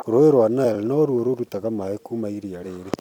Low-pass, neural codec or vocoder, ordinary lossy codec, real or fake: 19.8 kHz; vocoder, 44.1 kHz, 128 mel bands every 256 samples, BigVGAN v2; none; fake